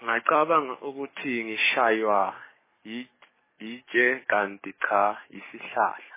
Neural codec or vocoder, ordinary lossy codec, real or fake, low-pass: codec, 44.1 kHz, 7.8 kbps, DAC; MP3, 16 kbps; fake; 3.6 kHz